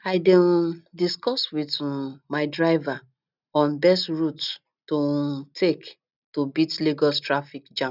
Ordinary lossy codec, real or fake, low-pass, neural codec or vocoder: none; real; 5.4 kHz; none